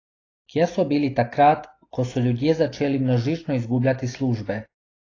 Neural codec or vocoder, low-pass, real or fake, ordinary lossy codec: none; 7.2 kHz; real; AAC, 32 kbps